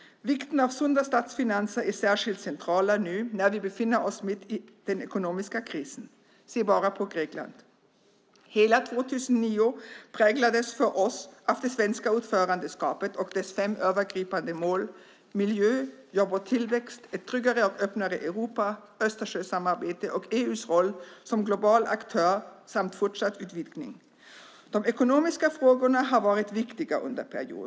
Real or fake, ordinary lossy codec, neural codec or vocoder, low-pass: real; none; none; none